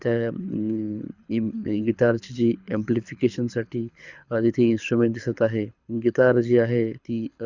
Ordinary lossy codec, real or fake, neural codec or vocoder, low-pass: Opus, 64 kbps; fake; codec, 24 kHz, 6 kbps, HILCodec; 7.2 kHz